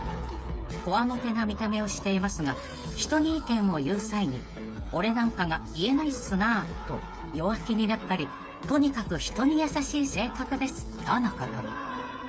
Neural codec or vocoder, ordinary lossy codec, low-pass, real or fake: codec, 16 kHz, 8 kbps, FreqCodec, smaller model; none; none; fake